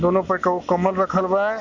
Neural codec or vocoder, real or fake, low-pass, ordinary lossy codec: none; real; 7.2 kHz; none